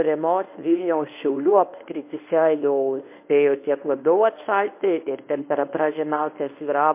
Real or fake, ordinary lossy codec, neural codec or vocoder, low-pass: fake; MP3, 24 kbps; codec, 24 kHz, 0.9 kbps, WavTokenizer, medium speech release version 1; 3.6 kHz